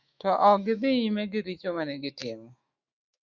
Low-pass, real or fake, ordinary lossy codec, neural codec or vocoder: 7.2 kHz; fake; Opus, 64 kbps; autoencoder, 48 kHz, 128 numbers a frame, DAC-VAE, trained on Japanese speech